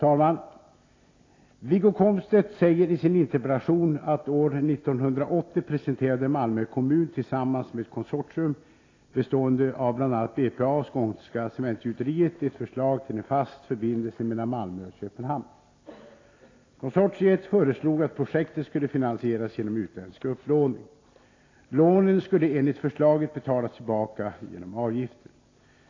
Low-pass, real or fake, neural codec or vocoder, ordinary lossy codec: 7.2 kHz; real; none; AAC, 32 kbps